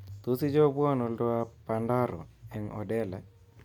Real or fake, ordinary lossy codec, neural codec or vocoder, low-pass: real; none; none; 19.8 kHz